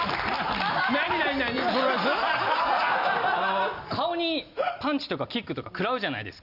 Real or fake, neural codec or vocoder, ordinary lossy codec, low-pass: real; none; none; 5.4 kHz